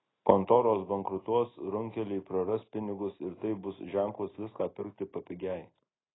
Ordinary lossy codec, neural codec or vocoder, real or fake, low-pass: AAC, 16 kbps; none; real; 7.2 kHz